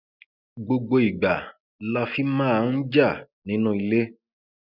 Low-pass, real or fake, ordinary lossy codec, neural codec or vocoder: 5.4 kHz; real; none; none